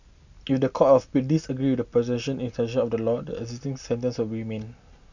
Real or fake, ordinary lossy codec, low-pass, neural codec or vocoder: real; none; 7.2 kHz; none